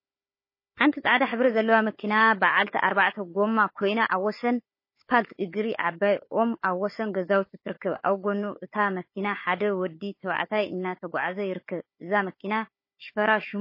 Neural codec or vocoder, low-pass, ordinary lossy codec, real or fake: codec, 16 kHz, 4 kbps, FunCodec, trained on Chinese and English, 50 frames a second; 5.4 kHz; MP3, 24 kbps; fake